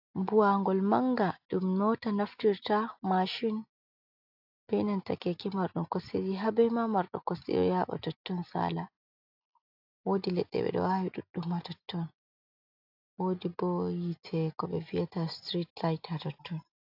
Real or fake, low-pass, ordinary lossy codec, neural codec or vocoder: real; 5.4 kHz; AAC, 48 kbps; none